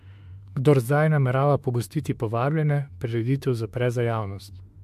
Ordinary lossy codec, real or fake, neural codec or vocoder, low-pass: MP3, 64 kbps; fake; autoencoder, 48 kHz, 32 numbers a frame, DAC-VAE, trained on Japanese speech; 14.4 kHz